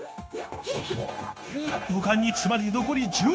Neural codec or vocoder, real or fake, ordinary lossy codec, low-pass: codec, 16 kHz, 0.9 kbps, LongCat-Audio-Codec; fake; none; none